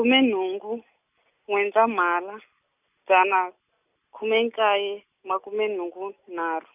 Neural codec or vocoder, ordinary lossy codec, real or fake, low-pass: none; none; real; 3.6 kHz